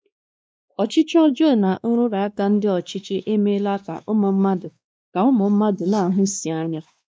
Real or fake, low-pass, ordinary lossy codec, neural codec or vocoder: fake; none; none; codec, 16 kHz, 2 kbps, X-Codec, WavLM features, trained on Multilingual LibriSpeech